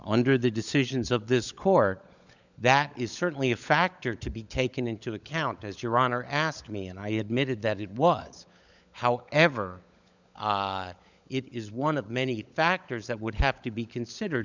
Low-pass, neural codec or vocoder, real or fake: 7.2 kHz; codec, 16 kHz, 16 kbps, FunCodec, trained on LibriTTS, 50 frames a second; fake